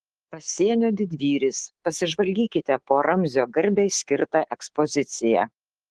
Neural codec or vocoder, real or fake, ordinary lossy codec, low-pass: codec, 24 kHz, 3.1 kbps, DualCodec; fake; Opus, 16 kbps; 10.8 kHz